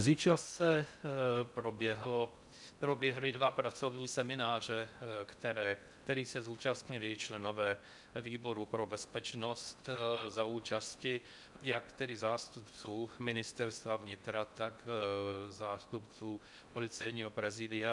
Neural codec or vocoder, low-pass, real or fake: codec, 16 kHz in and 24 kHz out, 0.6 kbps, FocalCodec, streaming, 4096 codes; 10.8 kHz; fake